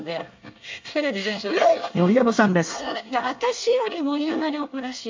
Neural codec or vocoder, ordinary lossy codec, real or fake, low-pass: codec, 24 kHz, 1 kbps, SNAC; MP3, 64 kbps; fake; 7.2 kHz